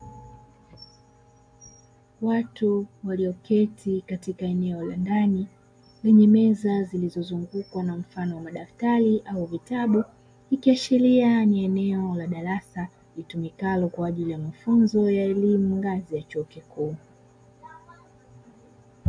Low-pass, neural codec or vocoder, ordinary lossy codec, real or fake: 9.9 kHz; none; AAC, 64 kbps; real